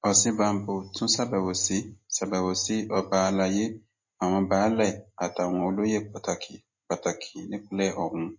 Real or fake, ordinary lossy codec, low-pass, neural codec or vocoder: real; MP3, 32 kbps; 7.2 kHz; none